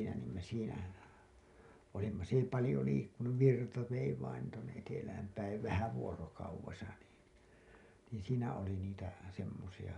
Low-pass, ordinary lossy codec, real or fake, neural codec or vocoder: 10.8 kHz; none; real; none